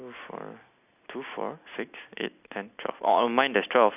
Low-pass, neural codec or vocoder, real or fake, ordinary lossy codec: 3.6 kHz; none; real; none